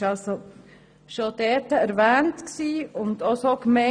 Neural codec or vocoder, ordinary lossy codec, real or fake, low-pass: none; none; real; 9.9 kHz